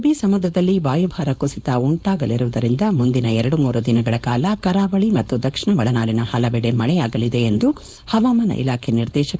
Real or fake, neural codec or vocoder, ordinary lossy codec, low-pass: fake; codec, 16 kHz, 4.8 kbps, FACodec; none; none